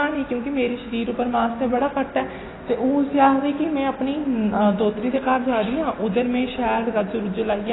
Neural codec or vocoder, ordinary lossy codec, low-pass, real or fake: none; AAC, 16 kbps; 7.2 kHz; real